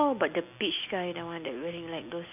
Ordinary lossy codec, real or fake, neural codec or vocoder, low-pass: none; real; none; 3.6 kHz